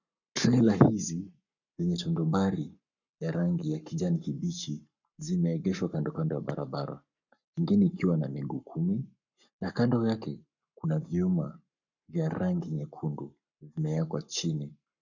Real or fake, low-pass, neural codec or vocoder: fake; 7.2 kHz; codec, 44.1 kHz, 7.8 kbps, Pupu-Codec